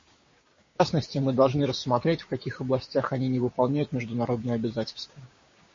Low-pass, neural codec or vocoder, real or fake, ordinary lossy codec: 7.2 kHz; codec, 16 kHz, 6 kbps, DAC; fake; MP3, 32 kbps